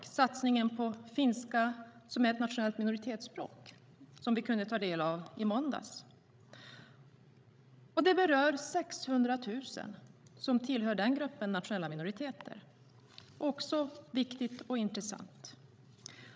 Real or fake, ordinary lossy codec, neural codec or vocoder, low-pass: fake; none; codec, 16 kHz, 16 kbps, FreqCodec, larger model; none